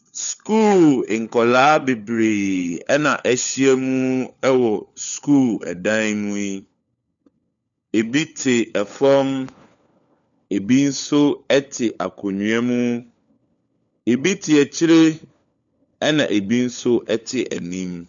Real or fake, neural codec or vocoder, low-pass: fake; codec, 16 kHz, 4 kbps, FunCodec, trained on LibriTTS, 50 frames a second; 7.2 kHz